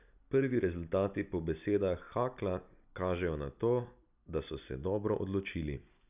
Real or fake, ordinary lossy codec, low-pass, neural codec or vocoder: real; none; 3.6 kHz; none